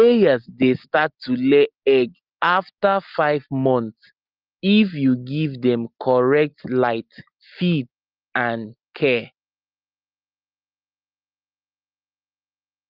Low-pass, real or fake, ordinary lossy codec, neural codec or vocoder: 5.4 kHz; real; Opus, 32 kbps; none